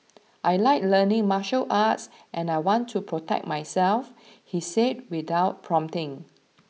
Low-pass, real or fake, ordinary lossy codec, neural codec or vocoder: none; real; none; none